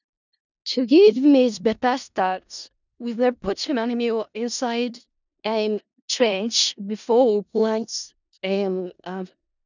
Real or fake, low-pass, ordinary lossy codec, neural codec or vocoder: fake; 7.2 kHz; none; codec, 16 kHz in and 24 kHz out, 0.4 kbps, LongCat-Audio-Codec, four codebook decoder